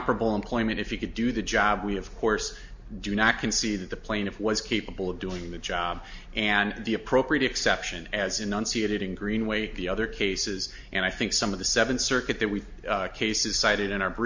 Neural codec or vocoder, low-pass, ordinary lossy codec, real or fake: none; 7.2 kHz; MP3, 48 kbps; real